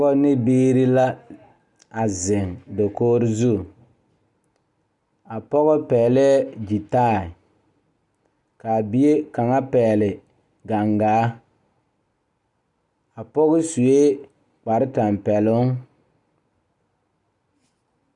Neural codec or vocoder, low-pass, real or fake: none; 10.8 kHz; real